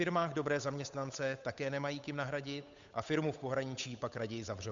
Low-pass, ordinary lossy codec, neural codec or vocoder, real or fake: 7.2 kHz; MP3, 64 kbps; codec, 16 kHz, 8 kbps, FunCodec, trained on Chinese and English, 25 frames a second; fake